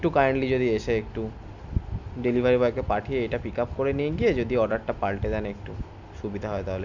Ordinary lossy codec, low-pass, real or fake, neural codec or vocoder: none; 7.2 kHz; real; none